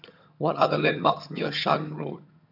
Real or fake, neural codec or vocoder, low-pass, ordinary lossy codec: fake; vocoder, 22.05 kHz, 80 mel bands, HiFi-GAN; 5.4 kHz; none